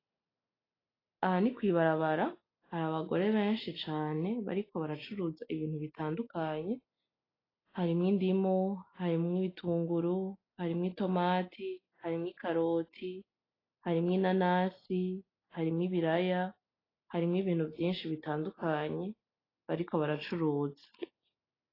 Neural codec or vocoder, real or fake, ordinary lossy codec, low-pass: none; real; AAC, 24 kbps; 5.4 kHz